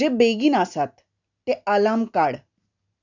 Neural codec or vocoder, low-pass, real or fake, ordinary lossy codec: none; 7.2 kHz; real; none